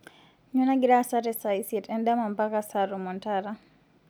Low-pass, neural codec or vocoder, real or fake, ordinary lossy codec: none; none; real; none